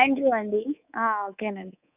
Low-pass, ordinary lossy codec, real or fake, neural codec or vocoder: 3.6 kHz; none; fake; codec, 24 kHz, 3.1 kbps, DualCodec